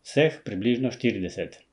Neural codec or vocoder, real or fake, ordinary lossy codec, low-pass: none; real; none; 10.8 kHz